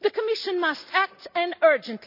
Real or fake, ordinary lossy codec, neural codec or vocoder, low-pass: real; none; none; 5.4 kHz